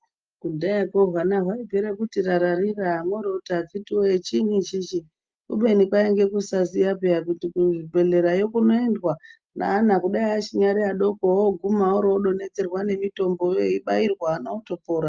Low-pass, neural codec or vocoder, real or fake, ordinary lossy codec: 7.2 kHz; none; real; Opus, 24 kbps